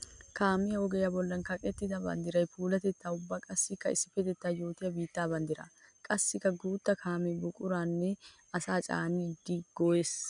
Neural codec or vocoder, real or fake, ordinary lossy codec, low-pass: none; real; MP3, 96 kbps; 9.9 kHz